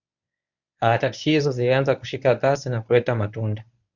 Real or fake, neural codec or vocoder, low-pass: fake; codec, 24 kHz, 0.9 kbps, WavTokenizer, medium speech release version 1; 7.2 kHz